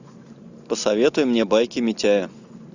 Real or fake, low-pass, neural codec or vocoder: real; 7.2 kHz; none